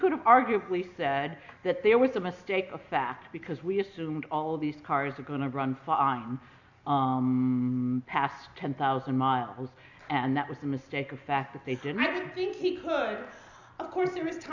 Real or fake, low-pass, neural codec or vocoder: real; 7.2 kHz; none